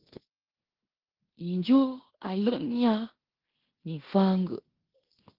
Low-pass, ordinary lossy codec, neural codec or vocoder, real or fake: 5.4 kHz; Opus, 16 kbps; codec, 16 kHz in and 24 kHz out, 0.9 kbps, LongCat-Audio-Codec, fine tuned four codebook decoder; fake